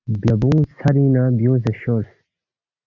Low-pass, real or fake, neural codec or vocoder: 7.2 kHz; real; none